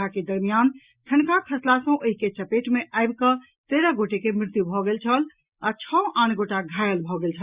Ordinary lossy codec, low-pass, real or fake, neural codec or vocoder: Opus, 64 kbps; 3.6 kHz; real; none